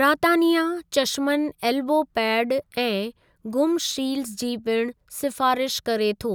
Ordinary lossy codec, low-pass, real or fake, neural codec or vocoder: none; none; real; none